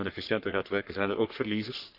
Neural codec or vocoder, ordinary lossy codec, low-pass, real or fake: codec, 44.1 kHz, 3.4 kbps, Pupu-Codec; none; 5.4 kHz; fake